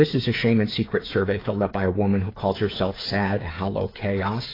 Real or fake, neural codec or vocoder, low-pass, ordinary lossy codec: fake; codec, 16 kHz, 8 kbps, FreqCodec, smaller model; 5.4 kHz; AAC, 24 kbps